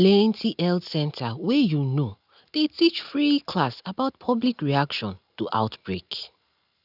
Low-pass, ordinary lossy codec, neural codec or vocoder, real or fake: 5.4 kHz; none; none; real